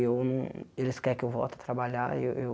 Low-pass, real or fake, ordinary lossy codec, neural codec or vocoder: none; real; none; none